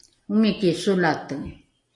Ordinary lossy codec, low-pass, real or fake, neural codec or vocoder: MP3, 48 kbps; 10.8 kHz; real; none